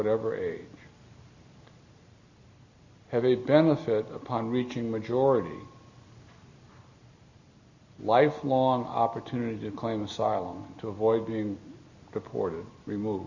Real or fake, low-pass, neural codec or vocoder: real; 7.2 kHz; none